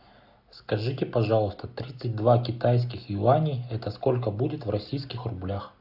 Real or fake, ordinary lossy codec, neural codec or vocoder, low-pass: real; AAC, 48 kbps; none; 5.4 kHz